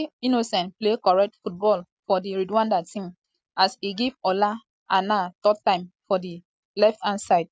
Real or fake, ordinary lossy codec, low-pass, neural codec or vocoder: real; none; none; none